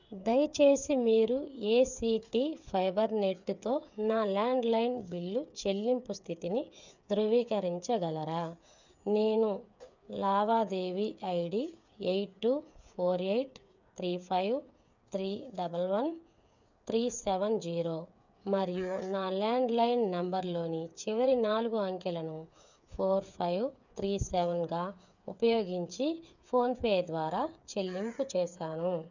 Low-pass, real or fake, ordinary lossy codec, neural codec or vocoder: 7.2 kHz; fake; none; codec, 16 kHz, 8 kbps, FreqCodec, smaller model